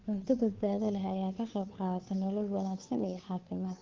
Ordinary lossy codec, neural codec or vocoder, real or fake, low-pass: Opus, 32 kbps; codec, 16 kHz, 2 kbps, FunCodec, trained on Chinese and English, 25 frames a second; fake; 7.2 kHz